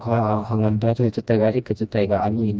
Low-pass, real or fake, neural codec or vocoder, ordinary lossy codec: none; fake; codec, 16 kHz, 1 kbps, FreqCodec, smaller model; none